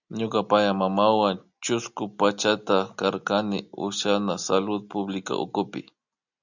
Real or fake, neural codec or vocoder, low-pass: real; none; 7.2 kHz